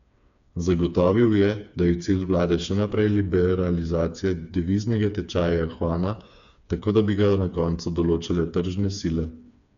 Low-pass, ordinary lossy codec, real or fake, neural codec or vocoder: 7.2 kHz; none; fake; codec, 16 kHz, 4 kbps, FreqCodec, smaller model